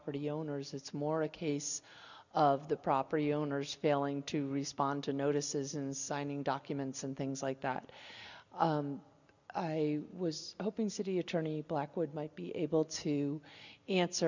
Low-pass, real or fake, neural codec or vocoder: 7.2 kHz; real; none